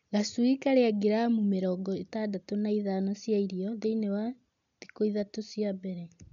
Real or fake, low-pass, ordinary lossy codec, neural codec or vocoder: real; 7.2 kHz; none; none